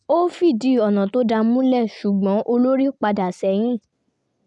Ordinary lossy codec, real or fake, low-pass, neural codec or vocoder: none; real; 10.8 kHz; none